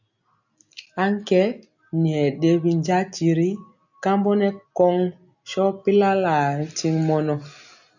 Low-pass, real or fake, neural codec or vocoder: 7.2 kHz; real; none